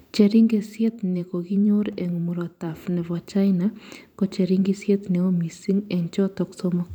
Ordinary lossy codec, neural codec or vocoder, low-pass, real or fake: none; vocoder, 44.1 kHz, 128 mel bands every 512 samples, BigVGAN v2; 19.8 kHz; fake